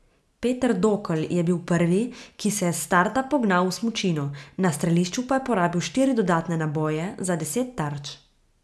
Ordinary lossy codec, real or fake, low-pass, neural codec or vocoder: none; real; none; none